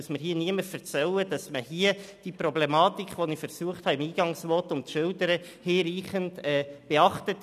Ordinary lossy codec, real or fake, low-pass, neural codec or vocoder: none; real; 14.4 kHz; none